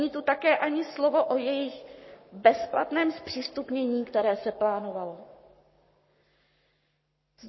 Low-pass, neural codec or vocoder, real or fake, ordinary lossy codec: 7.2 kHz; codec, 44.1 kHz, 7.8 kbps, Pupu-Codec; fake; MP3, 24 kbps